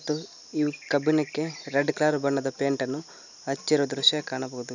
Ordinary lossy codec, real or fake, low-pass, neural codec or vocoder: none; real; 7.2 kHz; none